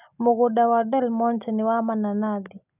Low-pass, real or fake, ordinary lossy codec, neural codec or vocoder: 3.6 kHz; real; none; none